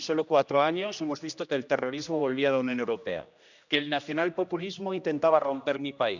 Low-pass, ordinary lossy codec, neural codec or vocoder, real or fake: 7.2 kHz; none; codec, 16 kHz, 1 kbps, X-Codec, HuBERT features, trained on general audio; fake